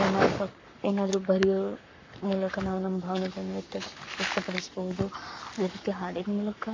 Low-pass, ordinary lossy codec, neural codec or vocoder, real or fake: 7.2 kHz; MP3, 48 kbps; codec, 44.1 kHz, 7.8 kbps, Pupu-Codec; fake